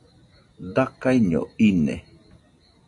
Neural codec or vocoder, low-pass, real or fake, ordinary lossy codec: none; 10.8 kHz; real; AAC, 64 kbps